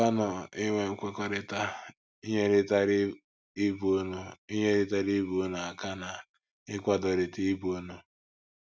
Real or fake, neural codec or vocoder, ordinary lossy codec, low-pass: real; none; none; none